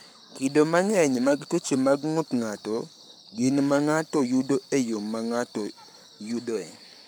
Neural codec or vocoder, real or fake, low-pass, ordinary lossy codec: codec, 44.1 kHz, 7.8 kbps, Pupu-Codec; fake; none; none